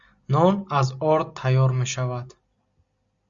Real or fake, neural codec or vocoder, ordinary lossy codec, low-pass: real; none; Opus, 64 kbps; 7.2 kHz